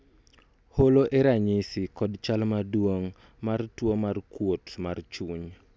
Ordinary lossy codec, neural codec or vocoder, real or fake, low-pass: none; none; real; none